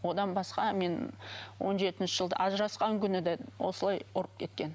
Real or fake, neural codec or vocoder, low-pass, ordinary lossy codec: real; none; none; none